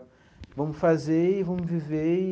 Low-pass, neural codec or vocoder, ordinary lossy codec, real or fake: none; none; none; real